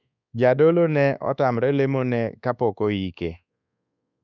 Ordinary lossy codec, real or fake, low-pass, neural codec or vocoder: none; fake; 7.2 kHz; codec, 24 kHz, 1.2 kbps, DualCodec